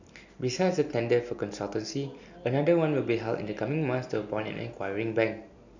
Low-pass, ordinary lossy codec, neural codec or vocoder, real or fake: 7.2 kHz; none; none; real